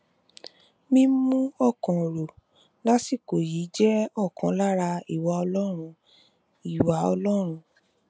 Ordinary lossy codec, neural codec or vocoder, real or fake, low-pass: none; none; real; none